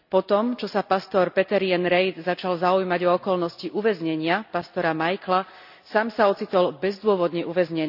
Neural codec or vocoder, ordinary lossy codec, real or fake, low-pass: none; none; real; 5.4 kHz